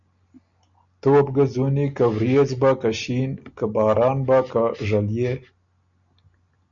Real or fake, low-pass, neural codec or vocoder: real; 7.2 kHz; none